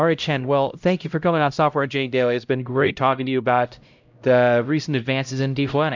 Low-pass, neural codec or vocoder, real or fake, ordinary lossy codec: 7.2 kHz; codec, 16 kHz, 0.5 kbps, X-Codec, HuBERT features, trained on LibriSpeech; fake; MP3, 64 kbps